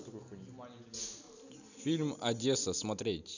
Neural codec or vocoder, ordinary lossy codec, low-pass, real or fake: none; none; 7.2 kHz; real